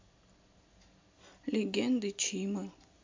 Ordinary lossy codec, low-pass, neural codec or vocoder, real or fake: MP3, 48 kbps; 7.2 kHz; none; real